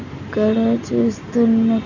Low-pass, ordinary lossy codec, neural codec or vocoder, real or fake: 7.2 kHz; none; none; real